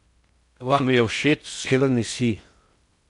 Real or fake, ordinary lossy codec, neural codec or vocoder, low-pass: fake; none; codec, 16 kHz in and 24 kHz out, 0.6 kbps, FocalCodec, streaming, 4096 codes; 10.8 kHz